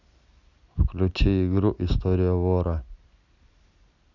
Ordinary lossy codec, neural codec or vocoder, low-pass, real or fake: none; none; 7.2 kHz; real